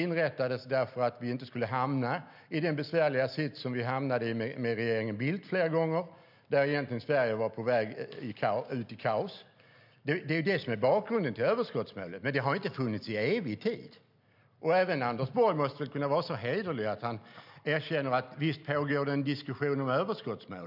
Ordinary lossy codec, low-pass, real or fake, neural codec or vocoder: none; 5.4 kHz; real; none